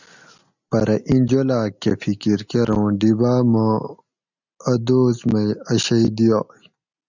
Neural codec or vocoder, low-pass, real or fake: none; 7.2 kHz; real